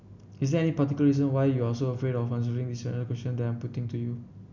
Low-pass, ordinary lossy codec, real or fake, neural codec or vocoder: 7.2 kHz; none; real; none